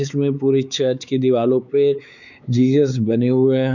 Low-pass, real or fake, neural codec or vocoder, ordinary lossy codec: 7.2 kHz; fake; codec, 16 kHz, 4 kbps, X-Codec, WavLM features, trained on Multilingual LibriSpeech; none